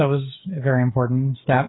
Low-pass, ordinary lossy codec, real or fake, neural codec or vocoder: 7.2 kHz; AAC, 16 kbps; fake; codec, 16 kHz, 8 kbps, FreqCodec, smaller model